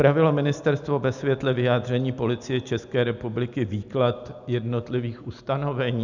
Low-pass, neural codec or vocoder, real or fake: 7.2 kHz; none; real